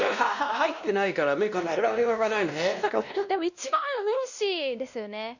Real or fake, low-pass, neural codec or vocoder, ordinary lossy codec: fake; 7.2 kHz; codec, 16 kHz, 1 kbps, X-Codec, WavLM features, trained on Multilingual LibriSpeech; none